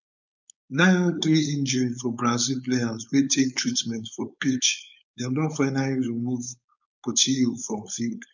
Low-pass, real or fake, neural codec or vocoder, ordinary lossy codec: 7.2 kHz; fake; codec, 16 kHz, 4.8 kbps, FACodec; none